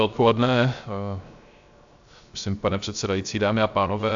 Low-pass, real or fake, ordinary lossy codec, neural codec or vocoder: 7.2 kHz; fake; AAC, 64 kbps; codec, 16 kHz, 0.3 kbps, FocalCodec